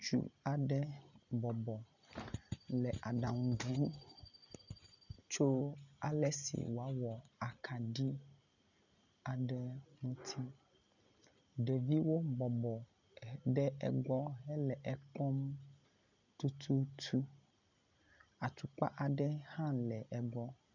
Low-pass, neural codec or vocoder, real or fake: 7.2 kHz; none; real